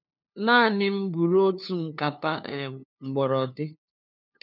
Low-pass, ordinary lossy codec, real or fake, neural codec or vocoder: 5.4 kHz; none; fake; codec, 16 kHz, 2 kbps, FunCodec, trained on LibriTTS, 25 frames a second